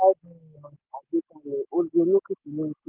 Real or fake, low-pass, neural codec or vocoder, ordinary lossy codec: real; 3.6 kHz; none; Opus, 32 kbps